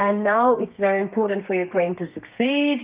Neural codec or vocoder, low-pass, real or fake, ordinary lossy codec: codec, 32 kHz, 1.9 kbps, SNAC; 3.6 kHz; fake; Opus, 24 kbps